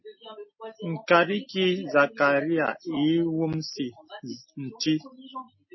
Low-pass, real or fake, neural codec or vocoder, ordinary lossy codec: 7.2 kHz; real; none; MP3, 24 kbps